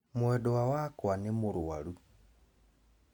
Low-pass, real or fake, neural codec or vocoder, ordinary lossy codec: 19.8 kHz; real; none; none